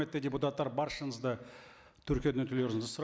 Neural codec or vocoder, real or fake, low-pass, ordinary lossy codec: none; real; none; none